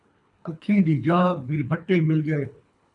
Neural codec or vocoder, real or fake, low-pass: codec, 24 kHz, 3 kbps, HILCodec; fake; 10.8 kHz